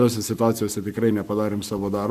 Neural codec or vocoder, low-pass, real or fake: codec, 44.1 kHz, 7.8 kbps, Pupu-Codec; 14.4 kHz; fake